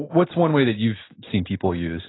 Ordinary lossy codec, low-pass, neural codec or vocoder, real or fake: AAC, 16 kbps; 7.2 kHz; none; real